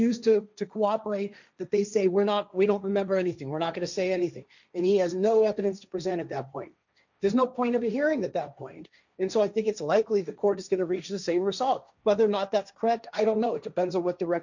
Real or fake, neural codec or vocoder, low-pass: fake; codec, 16 kHz, 1.1 kbps, Voila-Tokenizer; 7.2 kHz